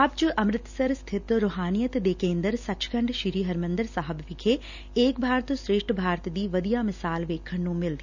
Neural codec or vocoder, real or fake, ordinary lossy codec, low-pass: none; real; none; 7.2 kHz